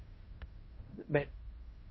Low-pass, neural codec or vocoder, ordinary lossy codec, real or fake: 7.2 kHz; codec, 16 kHz in and 24 kHz out, 0.9 kbps, LongCat-Audio-Codec, fine tuned four codebook decoder; MP3, 24 kbps; fake